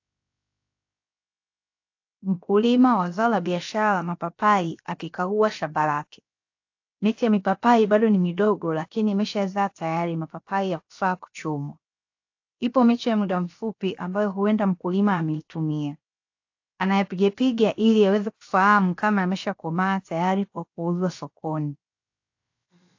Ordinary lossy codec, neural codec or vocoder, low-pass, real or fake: AAC, 48 kbps; codec, 16 kHz, 0.7 kbps, FocalCodec; 7.2 kHz; fake